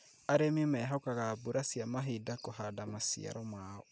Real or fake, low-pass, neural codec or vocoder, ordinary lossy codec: real; none; none; none